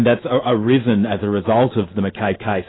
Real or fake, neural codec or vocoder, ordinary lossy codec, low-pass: real; none; AAC, 16 kbps; 7.2 kHz